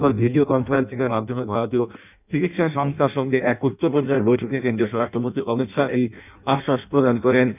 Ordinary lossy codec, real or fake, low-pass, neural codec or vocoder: none; fake; 3.6 kHz; codec, 16 kHz in and 24 kHz out, 0.6 kbps, FireRedTTS-2 codec